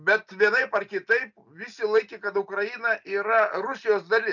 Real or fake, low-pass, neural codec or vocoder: real; 7.2 kHz; none